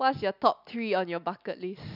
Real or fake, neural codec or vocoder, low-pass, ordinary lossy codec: real; none; 5.4 kHz; none